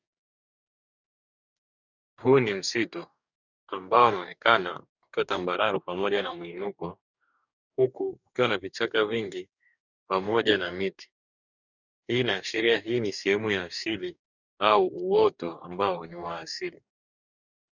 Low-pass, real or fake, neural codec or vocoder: 7.2 kHz; fake; codec, 44.1 kHz, 2.6 kbps, DAC